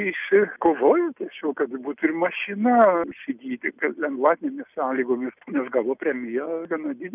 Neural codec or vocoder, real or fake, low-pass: none; real; 3.6 kHz